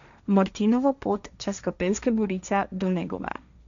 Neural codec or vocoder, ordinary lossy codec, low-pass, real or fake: codec, 16 kHz, 1.1 kbps, Voila-Tokenizer; none; 7.2 kHz; fake